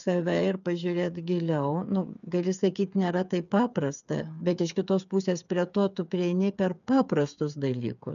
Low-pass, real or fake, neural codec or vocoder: 7.2 kHz; fake; codec, 16 kHz, 8 kbps, FreqCodec, smaller model